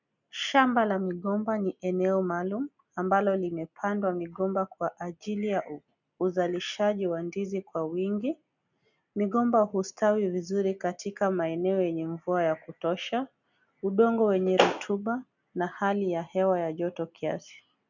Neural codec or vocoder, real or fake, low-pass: none; real; 7.2 kHz